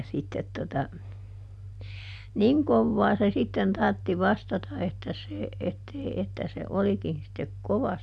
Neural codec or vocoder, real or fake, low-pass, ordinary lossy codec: none; real; none; none